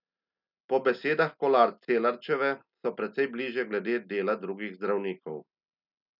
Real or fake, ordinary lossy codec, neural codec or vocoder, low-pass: real; none; none; 5.4 kHz